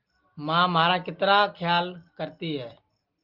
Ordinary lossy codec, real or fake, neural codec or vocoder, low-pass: Opus, 32 kbps; real; none; 5.4 kHz